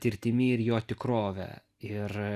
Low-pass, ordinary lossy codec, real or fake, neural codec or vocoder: 14.4 kHz; Opus, 64 kbps; real; none